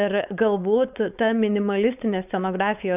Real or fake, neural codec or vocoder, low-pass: fake; codec, 16 kHz, 4.8 kbps, FACodec; 3.6 kHz